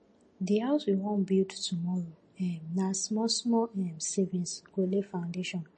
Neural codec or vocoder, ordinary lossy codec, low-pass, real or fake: none; MP3, 32 kbps; 10.8 kHz; real